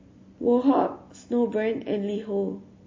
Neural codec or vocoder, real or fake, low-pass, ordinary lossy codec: none; real; 7.2 kHz; MP3, 32 kbps